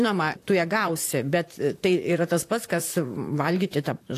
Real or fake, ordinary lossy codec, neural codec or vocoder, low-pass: fake; AAC, 64 kbps; vocoder, 44.1 kHz, 128 mel bands, Pupu-Vocoder; 14.4 kHz